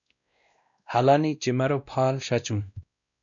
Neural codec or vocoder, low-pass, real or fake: codec, 16 kHz, 1 kbps, X-Codec, WavLM features, trained on Multilingual LibriSpeech; 7.2 kHz; fake